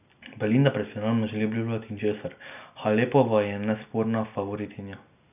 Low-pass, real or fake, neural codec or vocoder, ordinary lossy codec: 3.6 kHz; real; none; none